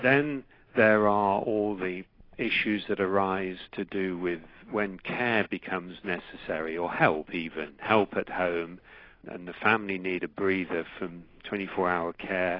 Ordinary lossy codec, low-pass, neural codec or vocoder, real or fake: AAC, 24 kbps; 5.4 kHz; none; real